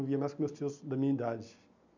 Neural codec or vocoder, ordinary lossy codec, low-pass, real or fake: none; none; 7.2 kHz; real